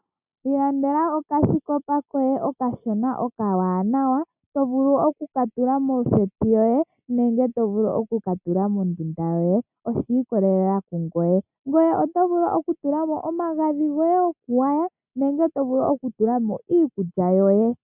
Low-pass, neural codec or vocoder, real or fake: 3.6 kHz; none; real